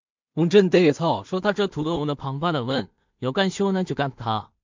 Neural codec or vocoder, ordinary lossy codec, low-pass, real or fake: codec, 16 kHz in and 24 kHz out, 0.4 kbps, LongCat-Audio-Codec, two codebook decoder; MP3, 64 kbps; 7.2 kHz; fake